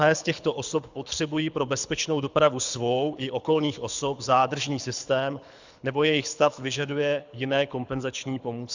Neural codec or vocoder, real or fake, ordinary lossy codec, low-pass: codec, 24 kHz, 6 kbps, HILCodec; fake; Opus, 64 kbps; 7.2 kHz